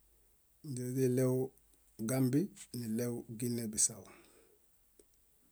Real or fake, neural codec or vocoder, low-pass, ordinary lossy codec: real; none; none; none